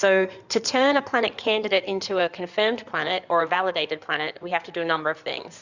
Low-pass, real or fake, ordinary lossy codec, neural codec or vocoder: 7.2 kHz; fake; Opus, 64 kbps; codec, 16 kHz in and 24 kHz out, 2.2 kbps, FireRedTTS-2 codec